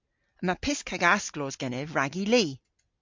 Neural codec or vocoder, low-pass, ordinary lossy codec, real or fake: none; 7.2 kHz; MP3, 64 kbps; real